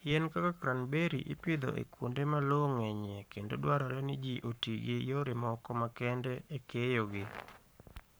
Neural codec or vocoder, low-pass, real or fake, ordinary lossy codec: codec, 44.1 kHz, 7.8 kbps, Pupu-Codec; none; fake; none